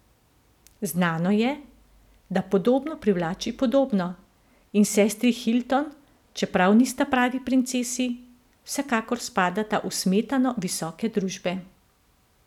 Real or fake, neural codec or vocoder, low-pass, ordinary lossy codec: real; none; 19.8 kHz; none